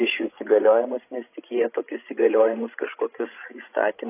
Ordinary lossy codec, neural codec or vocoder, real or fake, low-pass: AAC, 24 kbps; codec, 16 kHz, 16 kbps, FreqCodec, larger model; fake; 3.6 kHz